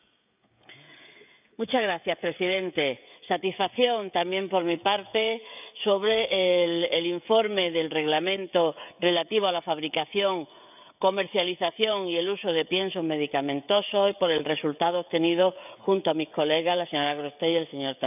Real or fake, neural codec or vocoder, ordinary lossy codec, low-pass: fake; codec, 16 kHz, 16 kbps, FreqCodec, smaller model; none; 3.6 kHz